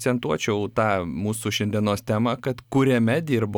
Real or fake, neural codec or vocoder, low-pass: real; none; 19.8 kHz